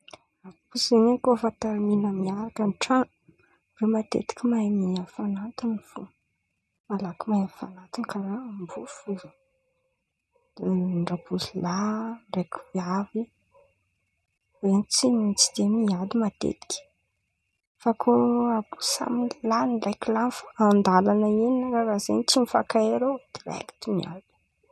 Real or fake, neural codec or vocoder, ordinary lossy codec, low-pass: real; none; none; none